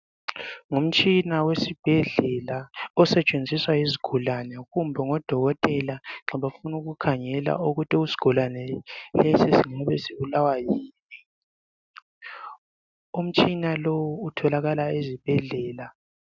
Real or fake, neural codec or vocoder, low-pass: real; none; 7.2 kHz